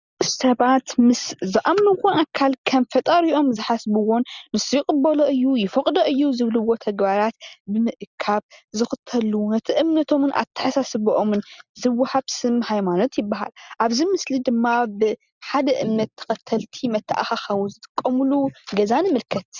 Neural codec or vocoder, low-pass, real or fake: none; 7.2 kHz; real